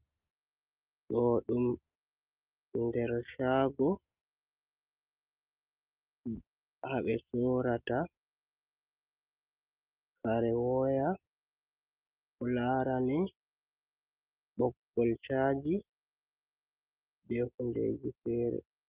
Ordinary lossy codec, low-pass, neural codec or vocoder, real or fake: Opus, 32 kbps; 3.6 kHz; none; real